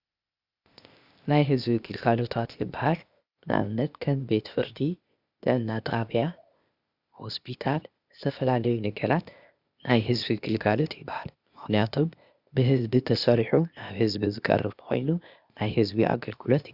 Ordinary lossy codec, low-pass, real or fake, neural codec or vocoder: Opus, 64 kbps; 5.4 kHz; fake; codec, 16 kHz, 0.8 kbps, ZipCodec